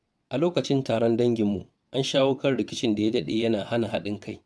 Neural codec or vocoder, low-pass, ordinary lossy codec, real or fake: vocoder, 22.05 kHz, 80 mel bands, WaveNeXt; none; none; fake